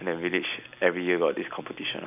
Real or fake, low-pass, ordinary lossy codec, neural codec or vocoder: real; 3.6 kHz; none; none